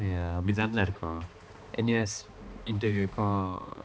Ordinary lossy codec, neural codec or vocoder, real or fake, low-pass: none; codec, 16 kHz, 2 kbps, X-Codec, HuBERT features, trained on balanced general audio; fake; none